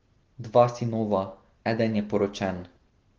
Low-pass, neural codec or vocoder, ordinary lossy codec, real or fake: 7.2 kHz; none; Opus, 16 kbps; real